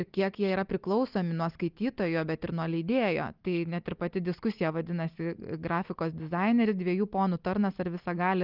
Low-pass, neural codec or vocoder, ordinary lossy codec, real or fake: 5.4 kHz; none; Opus, 32 kbps; real